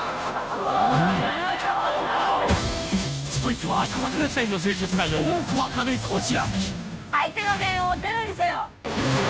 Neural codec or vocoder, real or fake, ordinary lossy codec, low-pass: codec, 16 kHz, 0.5 kbps, FunCodec, trained on Chinese and English, 25 frames a second; fake; none; none